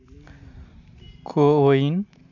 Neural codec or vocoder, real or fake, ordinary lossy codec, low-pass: none; real; none; 7.2 kHz